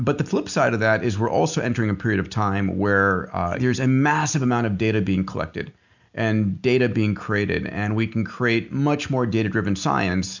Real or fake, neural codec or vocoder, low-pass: real; none; 7.2 kHz